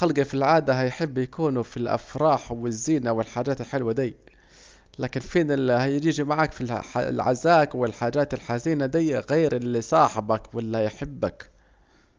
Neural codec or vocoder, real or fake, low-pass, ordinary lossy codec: none; real; 7.2 kHz; Opus, 24 kbps